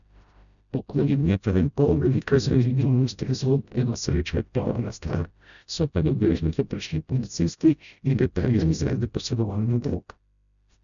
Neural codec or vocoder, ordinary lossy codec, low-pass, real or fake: codec, 16 kHz, 0.5 kbps, FreqCodec, smaller model; none; 7.2 kHz; fake